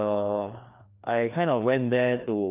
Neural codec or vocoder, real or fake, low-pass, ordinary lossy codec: codec, 16 kHz, 2 kbps, FreqCodec, larger model; fake; 3.6 kHz; Opus, 24 kbps